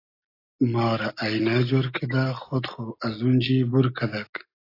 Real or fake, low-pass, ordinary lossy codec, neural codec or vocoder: real; 5.4 kHz; AAC, 32 kbps; none